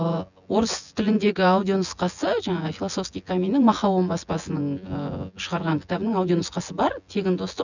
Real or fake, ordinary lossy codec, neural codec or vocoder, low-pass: fake; none; vocoder, 24 kHz, 100 mel bands, Vocos; 7.2 kHz